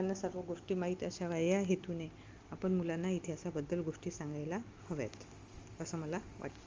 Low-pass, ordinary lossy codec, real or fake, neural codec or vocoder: 7.2 kHz; Opus, 32 kbps; real; none